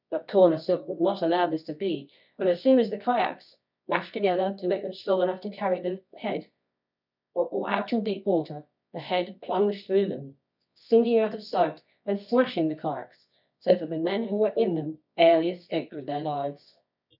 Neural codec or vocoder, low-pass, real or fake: codec, 24 kHz, 0.9 kbps, WavTokenizer, medium music audio release; 5.4 kHz; fake